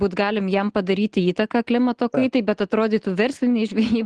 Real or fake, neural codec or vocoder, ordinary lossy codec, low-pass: real; none; Opus, 16 kbps; 9.9 kHz